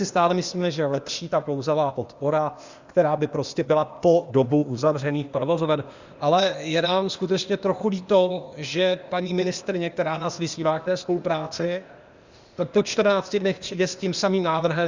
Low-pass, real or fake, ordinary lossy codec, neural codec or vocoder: 7.2 kHz; fake; Opus, 64 kbps; codec, 16 kHz, 0.8 kbps, ZipCodec